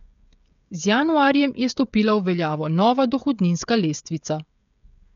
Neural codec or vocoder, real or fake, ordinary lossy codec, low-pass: codec, 16 kHz, 16 kbps, FreqCodec, smaller model; fake; none; 7.2 kHz